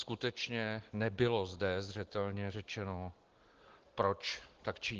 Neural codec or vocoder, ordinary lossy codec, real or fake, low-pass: none; Opus, 16 kbps; real; 7.2 kHz